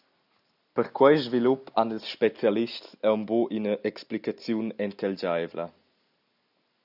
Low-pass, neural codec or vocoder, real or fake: 5.4 kHz; none; real